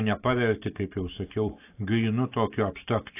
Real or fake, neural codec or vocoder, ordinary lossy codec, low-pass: real; none; AAC, 24 kbps; 3.6 kHz